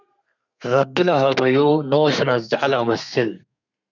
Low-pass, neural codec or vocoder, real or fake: 7.2 kHz; codec, 32 kHz, 1.9 kbps, SNAC; fake